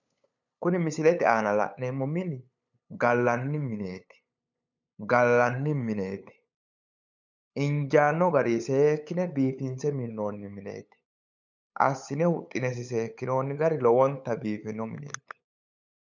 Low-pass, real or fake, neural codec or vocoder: 7.2 kHz; fake; codec, 16 kHz, 8 kbps, FunCodec, trained on LibriTTS, 25 frames a second